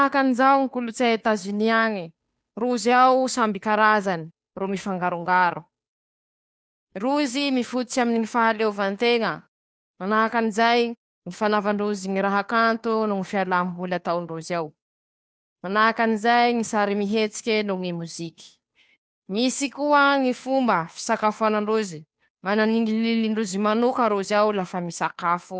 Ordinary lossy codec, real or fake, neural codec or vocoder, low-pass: none; fake; codec, 16 kHz, 2 kbps, FunCodec, trained on Chinese and English, 25 frames a second; none